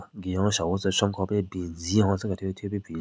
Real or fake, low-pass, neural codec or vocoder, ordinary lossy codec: real; none; none; none